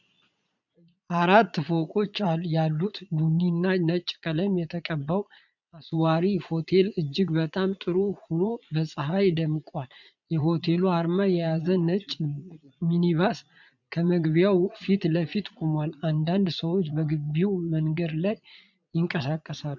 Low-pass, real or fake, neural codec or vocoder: 7.2 kHz; fake; vocoder, 22.05 kHz, 80 mel bands, Vocos